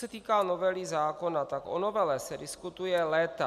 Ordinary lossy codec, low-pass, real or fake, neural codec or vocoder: MP3, 96 kbps; 14.4 kHz; real; none